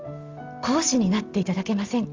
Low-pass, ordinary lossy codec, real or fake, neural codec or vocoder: 7.2 kHz; Opus, 32 kbps; real; none